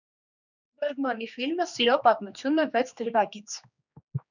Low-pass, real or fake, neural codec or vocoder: 7.2 kHz; fake; codec, 16 kHz, 2 kbps, X-Codec, HuBERT features, trained on general audio